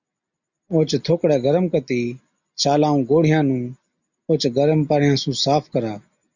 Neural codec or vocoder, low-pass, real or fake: none; 7.2 kHz; real